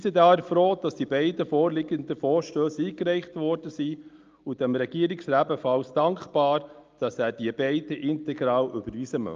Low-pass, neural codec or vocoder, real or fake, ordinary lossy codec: 7.2 kHz; none; real; Opus, 24 kbps